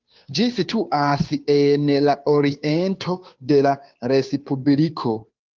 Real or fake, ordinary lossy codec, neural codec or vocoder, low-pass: fake; Opus, 32 kbps; codec, 16 kHz, 2 kbps, FunCodec, trained on Chinese and English, 25 frames a second; 7.2 kHz